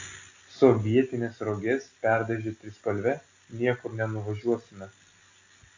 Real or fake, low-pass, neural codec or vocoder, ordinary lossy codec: real; 7.2 kHz; none; AAC, 48 kbps